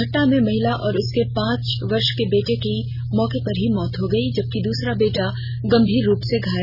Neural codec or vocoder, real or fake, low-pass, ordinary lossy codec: none; real; 5.4 kHz; none